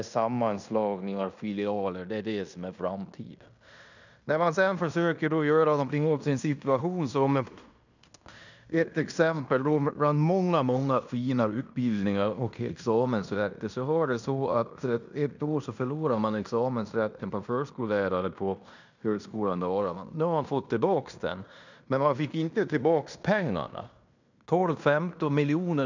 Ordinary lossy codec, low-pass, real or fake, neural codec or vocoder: none; 7.2 kHz; fake; codec, 16 kHz in and 24 kHz out, 0.9 kbps, LongCat-Audio-Codec, fine tuned four codebook decoder